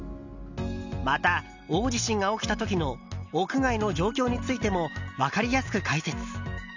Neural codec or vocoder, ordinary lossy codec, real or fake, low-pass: none; none; real; 7.2 kHz